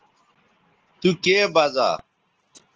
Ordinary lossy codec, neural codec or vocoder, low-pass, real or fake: Opus, 16 kbps; none; 7.2 kHz; real